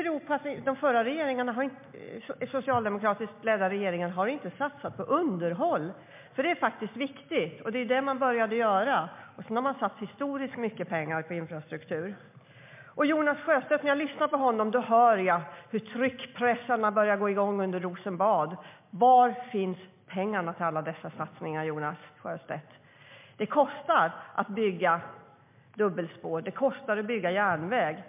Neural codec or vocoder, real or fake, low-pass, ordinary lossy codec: none; real; 3.6 kHz; MP3, 32 kbps